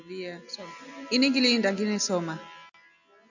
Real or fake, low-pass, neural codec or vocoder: real; 7.2 kHz; none